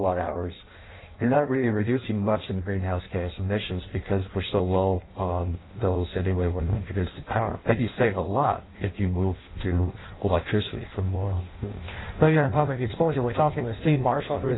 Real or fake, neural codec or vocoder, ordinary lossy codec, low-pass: fake; codec, 16 kHz in and 24 kHz out, 0.6 kbps, FireRedTTS-2 codec; AAC, 16 kbps; 7.2 kHz